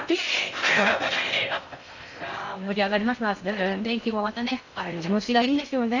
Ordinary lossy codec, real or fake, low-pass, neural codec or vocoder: none; fake; 7.2 kHz; codec, 16 kHz in and 24 kHz out, 0.6 kbps, FocalCodec, streaming, 2048 codes